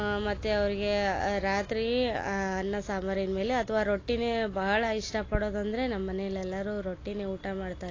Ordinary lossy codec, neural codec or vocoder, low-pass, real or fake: AAC, 32 kbps; none; 7.2 kHz; real